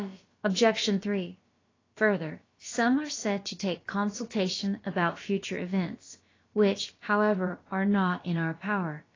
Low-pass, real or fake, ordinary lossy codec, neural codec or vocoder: 7.2 kHz; fake; AAC, 32 kbps; codec, 16 kHz, about 1 kbps, DyCAST, with the encoder's durations